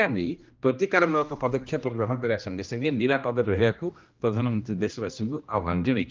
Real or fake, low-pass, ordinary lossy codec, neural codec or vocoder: fake; 7.2 kHz; Opus, 24 kbps; codec, 16 kHz, 1 kbps, X-Codec, HuBERT features, trained on general audio